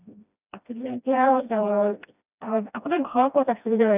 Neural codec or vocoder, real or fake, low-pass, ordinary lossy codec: codec, 16 kHz, 1 kbps, FreqCodec, smaller model; fake; 3.6 kHz; none